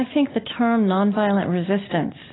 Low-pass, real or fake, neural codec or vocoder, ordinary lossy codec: 7.2 kHz; real; none; AAC, 16 kbps